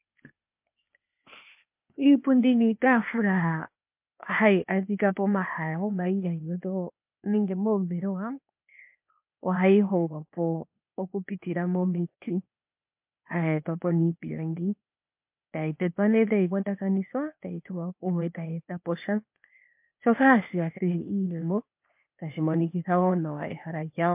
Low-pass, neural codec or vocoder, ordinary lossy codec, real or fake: 3.6 kHz; codec, 16 kHz, 0.8 kbps, ZipCodec; MP3, 32 kbps; fake